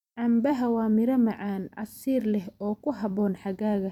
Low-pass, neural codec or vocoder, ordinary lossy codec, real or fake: 19.8 kHz; vocoder, 44.1 kHz, 128 mel bands every 256 samples, BigVGAN v2; none; fake